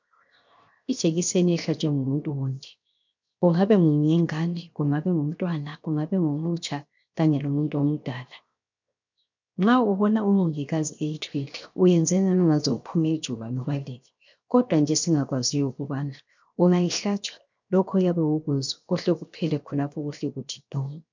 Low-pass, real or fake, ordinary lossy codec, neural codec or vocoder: 7.2 kHz; fake; MP3, 64 kbps; codec, 16 kHz, 0.7 kbps, FocalCodec